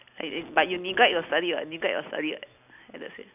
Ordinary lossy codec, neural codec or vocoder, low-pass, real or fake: none; none; 3.6 kHz; real